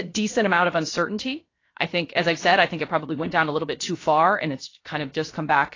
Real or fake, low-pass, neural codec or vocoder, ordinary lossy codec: fake; 7.2 kHz; codec, 16 kHz, about 1 kbps, DyCAST, with the encoder's durations; AAC, 32 kbps